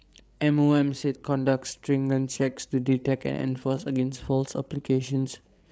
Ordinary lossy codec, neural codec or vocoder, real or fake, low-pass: none; codec, 16 kHz, 8 kbps, FreqCodec, larger model; fake; none